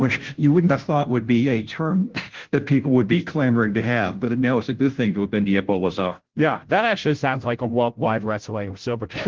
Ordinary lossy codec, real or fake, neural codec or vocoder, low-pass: Opus, 16 kbps; fake; codec, 16 kHz, 0.5 kbps, FunCodec, trained on Chinese and English, 25 frames a second; 7.2 kHz